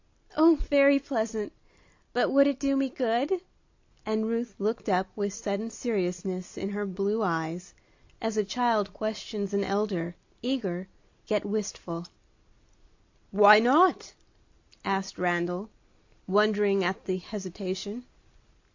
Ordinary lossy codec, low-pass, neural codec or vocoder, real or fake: AAC, 48 kbps; 7.2 kHz; none; real